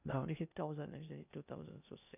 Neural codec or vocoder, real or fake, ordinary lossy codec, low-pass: codec, 16 kHz in and 24 kHz out, 0.6 kbps, FocalCodec, streaming, 2048 codes; fake; none; 3.6 kHz